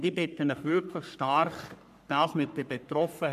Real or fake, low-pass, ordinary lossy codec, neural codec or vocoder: fake; 14.4 kHz; none; codec, 44.1 kHz, 3.4 kbps, Pupu-Codec